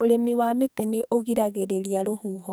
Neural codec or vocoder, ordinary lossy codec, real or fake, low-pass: codec, 44.1 kHz, 2.6 kbps, SNAC; none; fake; none